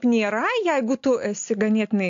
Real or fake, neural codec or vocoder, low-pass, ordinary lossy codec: real; none; 7.2 kHz; AAC, 48 kbps